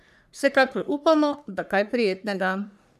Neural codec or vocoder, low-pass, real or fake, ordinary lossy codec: codec, 44.1 kHz, 3.4 kbps, Pupu-Codec; 14.4 kHz; fake; none